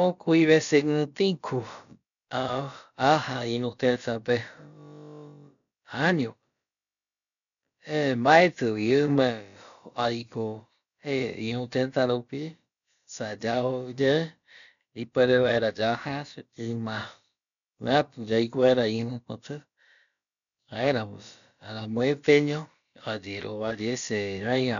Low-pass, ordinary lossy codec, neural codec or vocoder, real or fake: 7.2 kHz; none; codec, 16 kHz, about 1 kbps, DyCAST, with the encoder's durations; fake